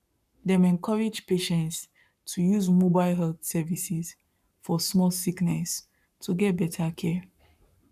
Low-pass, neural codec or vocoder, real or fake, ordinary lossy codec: 14.4 kHz; codec, 44.1 kHz, 7.8 kbps, Pupu-Codec; fake; none